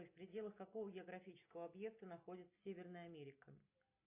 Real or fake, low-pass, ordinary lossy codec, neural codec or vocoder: real; 3.6 kHz; AAC, 32 kbps; none